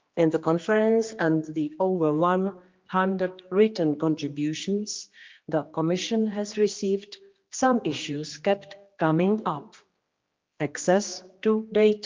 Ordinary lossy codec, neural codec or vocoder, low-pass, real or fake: Opus, 32 kbps; codec, 16 kHz, 2 kbps, X-Codec, HuBERT features, trained on general audio; 7.2 kHz; fake